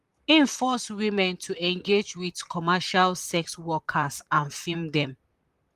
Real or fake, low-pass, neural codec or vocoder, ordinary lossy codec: fake; 14.4 kHz; vocoder, 44.1 kHz, 128 mel bands, Pupu-Vocoder; Opus, 32 kbps